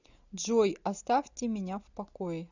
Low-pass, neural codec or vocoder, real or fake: 7.2 kHz; none; real